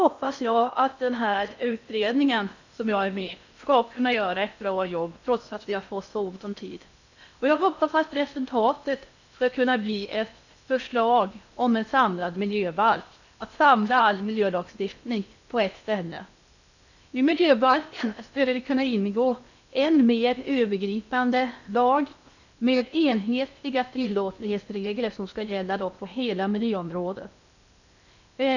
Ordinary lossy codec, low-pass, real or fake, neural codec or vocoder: none; 7.2 kHz; fake; codec, 16 kHz in and 24 kHz out, 0.6 kbps, FocalCodec, streaming, 4096 codes